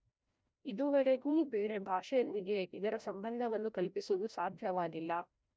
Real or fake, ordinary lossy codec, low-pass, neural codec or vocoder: fake; none; none; codec, 16 kHz, 0.5 kbps, FreqCodec, larger model